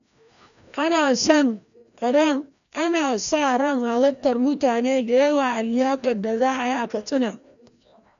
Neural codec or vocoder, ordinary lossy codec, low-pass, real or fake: codec, 16 kHz, 1 kbps, FreqCodec, larger model; none; 7.2 kHz; fake